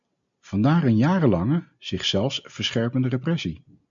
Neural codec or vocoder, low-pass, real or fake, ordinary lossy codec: none; 7.2 kHz; real; MP3, 64 kbps